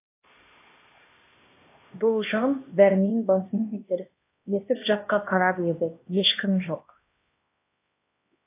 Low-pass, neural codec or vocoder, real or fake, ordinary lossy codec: 3.6 kHz; codec, 16 kHz, 1 kbps, X-Codec, HuBERT features, trained on LibriSpeech; fake; AAC, 24 kbps